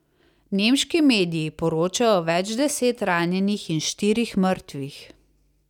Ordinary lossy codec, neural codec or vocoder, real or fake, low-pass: none; none; real; 19.8 kHz